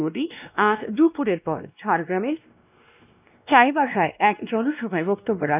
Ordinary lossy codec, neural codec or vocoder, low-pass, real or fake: none; codec, 16 kHz, 1 kbps, X-Codec, WavLM features, trained on Multilingual LibriSpeech; 3.6 kHz; fake